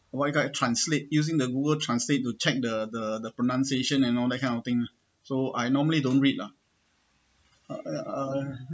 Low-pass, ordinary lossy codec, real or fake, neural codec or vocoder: none; none; real; none